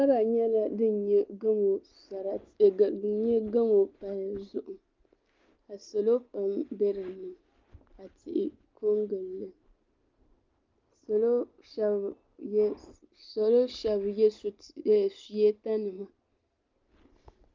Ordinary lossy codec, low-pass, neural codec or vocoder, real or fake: Opus, 24 kbps; 7.2 kHz; none; real